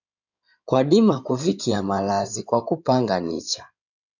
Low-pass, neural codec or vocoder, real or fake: 7.2 kHz; codec, 16 kHz in and 24 kHz out, 2.2 kbps, FireRedTTS-2 codec; fake